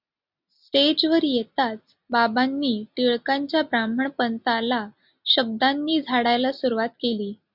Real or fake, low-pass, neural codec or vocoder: real; 5.4 kHz; none